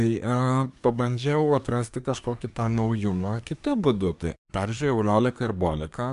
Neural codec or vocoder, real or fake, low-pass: codec, 24 kHz, 1 kbps, SNAC; fake; 10.8 kHz